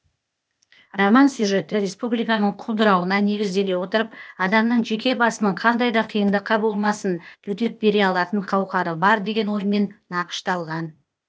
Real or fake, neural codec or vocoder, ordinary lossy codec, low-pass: fake; codec, 16 kHz, 0.8 kbps, ZipCodec; none; none